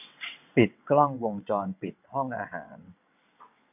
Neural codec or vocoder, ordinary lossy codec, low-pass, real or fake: vocoder, 22.05 kHz, 80 mel bands, WaveNeXt; none; 3.6 kHz; fake